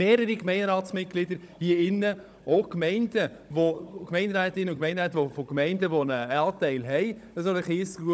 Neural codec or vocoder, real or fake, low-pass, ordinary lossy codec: codec, 16 kHz, 16 kbps, FunCodec, trained on Chinese and English, 50 frames a second; fake; none; none